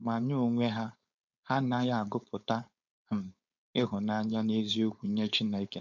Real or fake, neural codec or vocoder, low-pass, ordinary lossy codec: fake; codec, 16 kHz, 4.8 kbps, FACodec; 7.2 kHz; none